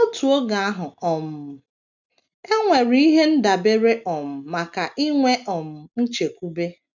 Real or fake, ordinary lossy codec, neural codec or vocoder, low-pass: real; none; none; 7.2 kHz